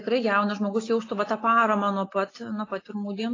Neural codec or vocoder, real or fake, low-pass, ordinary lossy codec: none; real; 7.2 kHz; AAC, 32 kbps